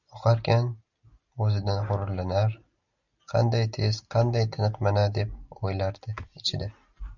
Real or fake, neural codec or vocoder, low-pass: real; none; 7.2 kHz